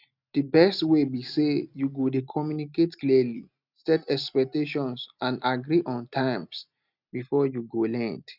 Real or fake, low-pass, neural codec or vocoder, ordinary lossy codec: real; 5.4 kHz; none; none